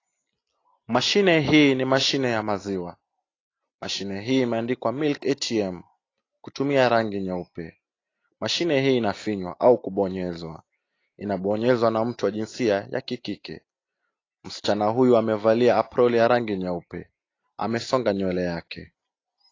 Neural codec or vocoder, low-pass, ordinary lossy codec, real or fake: none; 7.2 kHz; AAC, 32 kbps; real